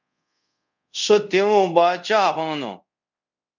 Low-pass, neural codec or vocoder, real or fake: 7.2 kHz; codec, 24 kHz, 0.5 kbps, DualCodec; fake